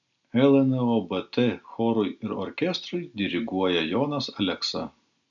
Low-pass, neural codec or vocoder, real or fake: 7.2 kHz; none; real